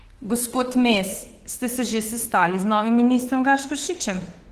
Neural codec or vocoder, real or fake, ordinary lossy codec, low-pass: autoencoder, 48 kHz, 32 numbers a frame, DAC-VAE, trained on Japanese speech; fake; Opus, 16 kbps; 14.4 kHz